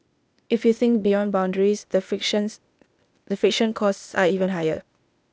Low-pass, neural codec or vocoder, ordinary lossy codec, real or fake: none; codec, 16 kHz, 0.8 kbps, ZipCodec; none; fake